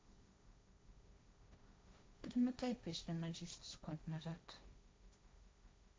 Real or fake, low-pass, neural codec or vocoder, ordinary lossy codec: fake; none; codec, 16 kHz, 1.1 kbps, Voila-Tokenizer; none